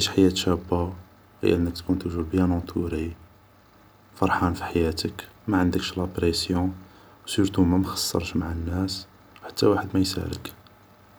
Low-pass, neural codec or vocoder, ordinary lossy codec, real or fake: none; none; none; real